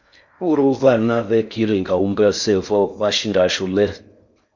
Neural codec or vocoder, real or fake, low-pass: codec, 16 kHz in and 24 kHz out, 0.6 kbps, FocalCodec, streaming, 4096 codes; fake; 7.2 kHz